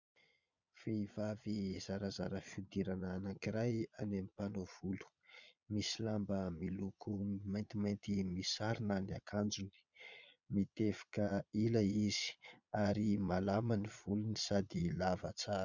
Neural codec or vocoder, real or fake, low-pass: vocoder, 22.05 kHz, 80 mel bands, Vocos; fake; 7.2 kHz